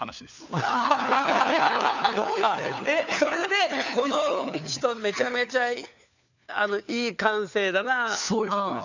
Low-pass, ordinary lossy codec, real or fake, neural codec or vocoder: 7.2 kHz; none; fake; codec, 16 kHz, 4 kbps, FunCodec, trained on LibriTTS, 50 frames a second